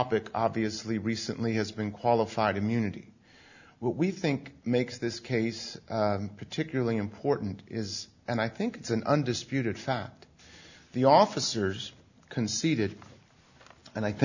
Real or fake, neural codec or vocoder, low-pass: real; none; 7.2 kHz